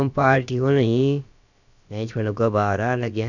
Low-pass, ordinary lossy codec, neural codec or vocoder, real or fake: 7.2 kHz; none; codec, 16 kHz, about 1 kbps, DyCAST, with the encoder's durations; fake